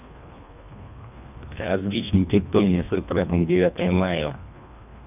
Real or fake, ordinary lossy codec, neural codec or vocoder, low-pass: fake; none; codec, 24 kHz, 1.5 kbps, HILCodec; 3.6 kHz